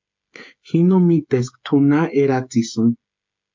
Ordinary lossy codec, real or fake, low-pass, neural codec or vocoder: MP3, 48 kbps; fake; 7.2 kHz; codec, 16 kHz, 16 kbps, FreqCodec, smaller model